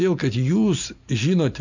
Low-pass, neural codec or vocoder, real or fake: 7.2 kHz; none; real